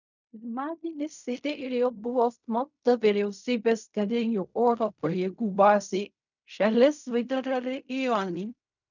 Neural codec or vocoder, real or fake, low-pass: codec, 16 kHz in and 24 kHz out, 0.4 kbps, LongCat-Audio-Codec, fine tuned four codebook decoder; fake; 7.2 kHz